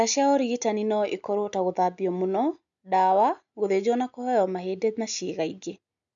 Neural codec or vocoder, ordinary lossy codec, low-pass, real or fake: none; none; 7.2 kHz; real